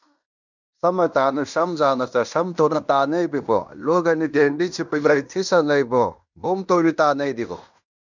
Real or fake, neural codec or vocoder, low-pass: fake; codec, 16 kHz in and 24 kHz out, 0.9 kbps, LongCat-Audio-Codec, fine tuned four codebook decoder; 7.2 kHz